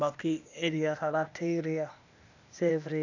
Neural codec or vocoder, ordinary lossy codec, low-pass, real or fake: codec, 16 kHz, 0.8 kbps, ZipCodec; none; 7.2 kHz; fake